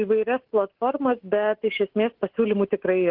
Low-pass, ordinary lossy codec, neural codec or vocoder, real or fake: 5.4 kHz; Opus, 16 kbps; none; real